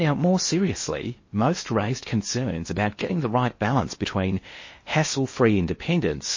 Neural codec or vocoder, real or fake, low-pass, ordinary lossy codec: codec, 16 kHz in and 24 kHz out, 0.8 kbps, FocalCodec, streaming, 65536 codes; fake; 7.2 kHz; MP3, 32 kbps